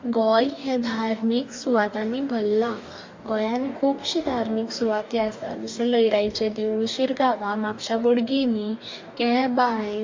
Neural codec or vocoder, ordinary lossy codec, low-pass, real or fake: codec, 44.1 kHz, 2.6 kbps, DAC; MP3, 48 kbps; 7.2 kHz; fake